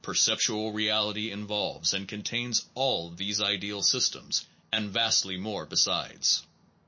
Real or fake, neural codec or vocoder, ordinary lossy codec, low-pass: real; none; MP3, 32 kbps; 7.2 kHz